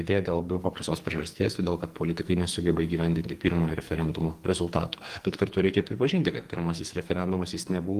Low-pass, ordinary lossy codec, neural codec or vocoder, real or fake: 14.4 kHz; Opus, 24 kbps; codec, 44.1 kHz, 2.6 kbps, SNAC; fake